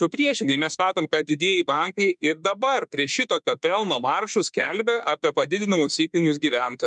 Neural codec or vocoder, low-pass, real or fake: autoencoder, 48 kHz, 32 numbers a frame, DAC-VAE, trained on Japanese speech; 10.8 kHz; fake